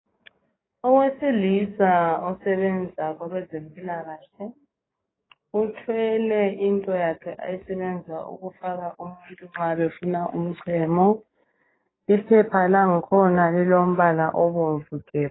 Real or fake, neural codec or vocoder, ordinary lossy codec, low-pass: fake; codec, 44.1 kHz, 7.8 kbps, DAC; AAC, 16 kbps; 7.2 kHz